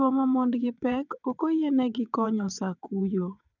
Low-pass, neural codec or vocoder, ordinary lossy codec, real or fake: 7.2 kHz; vocoder, 22.05 kHz, 80 mel bands, WaveNeXt; none; fake